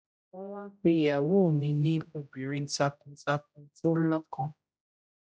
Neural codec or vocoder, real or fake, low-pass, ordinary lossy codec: codec, 16 kHz, 0.5 kbps, X-Codec, HuBERT features, trained on general audio; fake; none; none